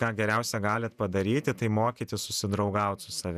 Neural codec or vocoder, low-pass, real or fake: vocoder, 48 kHz, 128 mel bands, Vocos; 14.4 kHz; fake